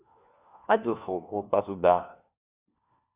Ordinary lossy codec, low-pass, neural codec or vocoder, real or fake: Opus, 64 kbps; 3.6 kHz; codec, 16 kHz, 1 kbps, FunCodec, trained on LibriTTS, 50 frames a second; fake